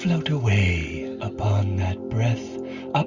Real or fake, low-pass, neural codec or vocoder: real; 7.2 kHz; none